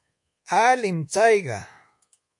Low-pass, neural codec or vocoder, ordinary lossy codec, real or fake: 10.8 kHz; codec, 24 kHz, 1.2 kbps, DualCodec; MP3, 48 kbps; fake